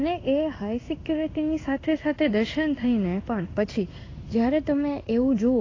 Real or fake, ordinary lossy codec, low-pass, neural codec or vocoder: fake; AAC, 32 kbps; 7.2 kHz; codec, 16 kHz in and 24 kHz out, 1 kbps, XY-Tokenizer